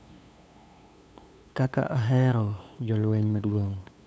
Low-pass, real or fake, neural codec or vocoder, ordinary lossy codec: none; fake; codec, 16 kHz, 2 kbps, FunCodec, trained on LibriTTS, 25 frames a second; none